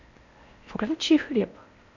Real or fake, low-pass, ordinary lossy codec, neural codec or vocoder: fake; 7.2 kHz; none; codec, 16 kHz in and 24 kHz out, 0.6 kbps, FocalCodec, streaming, 4096 codes